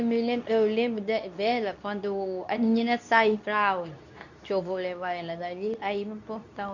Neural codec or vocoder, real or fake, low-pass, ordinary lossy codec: codec, 24 kHz, 0.9 kbps, WavTokenizer, medium speech release version 2; fake; 7.2 kHz; none